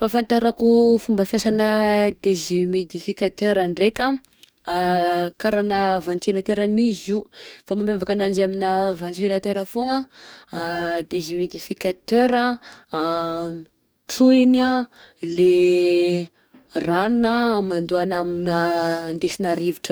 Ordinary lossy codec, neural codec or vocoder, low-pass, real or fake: none; codec, 44.1 kHz, 2.6 kbps, DAC; none; fake